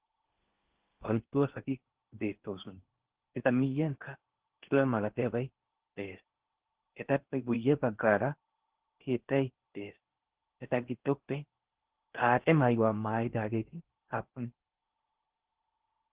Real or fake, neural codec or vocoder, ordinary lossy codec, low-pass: fake; codec, 16 kHz in and 24 kHz out, 0.6 kbps, FocalCodec, streaming, 4096 codes; Opus, 16 kbps; 3.6 kHz